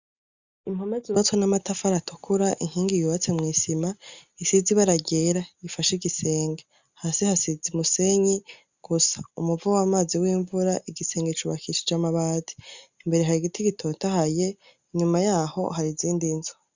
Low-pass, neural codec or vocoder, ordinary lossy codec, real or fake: 7.2 kHz; none; Opus, 64 kbps; real